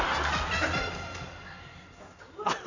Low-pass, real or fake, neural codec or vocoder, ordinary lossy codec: 7.2 kHz; real; none; none